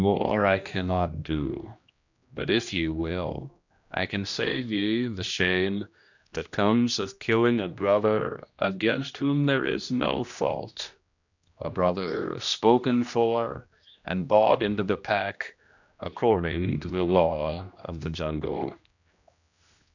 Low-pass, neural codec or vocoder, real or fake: 7.2 kHz; codec, 16 kHz, 1 kbps, X-Codec, HuBERT features, trained on general audio; fake